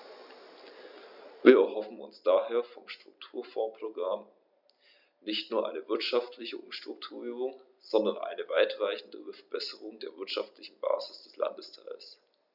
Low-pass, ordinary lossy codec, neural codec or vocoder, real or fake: 5.4 kHz; none; none; real